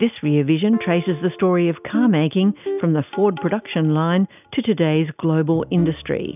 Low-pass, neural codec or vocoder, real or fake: 3.6 kHz; none; real